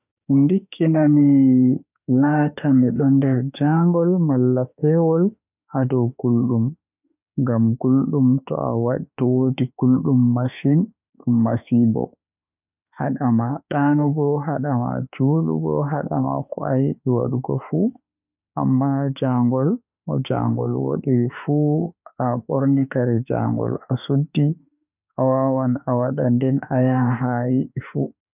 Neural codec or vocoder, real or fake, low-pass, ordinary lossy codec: autoencoder, 48 kHz, 32 numbers a frame, DAC-VAE, trained on Japanese speech; fake; 3.6 kHz; none